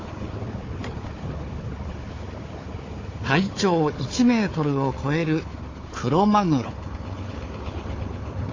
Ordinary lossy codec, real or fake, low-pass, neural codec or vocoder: AAC, 32 kbps; fake; 7.2 kHz; codec, 16 kHz, 4 kbps, FunCodec, trained on Chinese and English, 50 frames a second